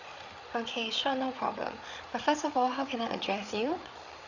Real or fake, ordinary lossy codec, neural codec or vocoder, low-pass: fake; none; codec, 16 kHz, 8 kbps, FreqCodec, larger model; 7.2 kHz